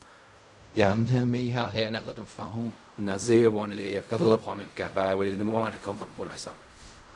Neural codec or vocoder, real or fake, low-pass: codec, 16 kHz in and 24 kHz out, 0.4 kbps, LongCat-Audio-Codec, fine tuned four codebook decoder; fake; 10.8 kHz